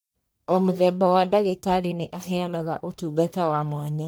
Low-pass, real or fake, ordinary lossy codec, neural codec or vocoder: none; fake; none; codec, 44.1 kHz, 1.7 kbps, Pupu-Codec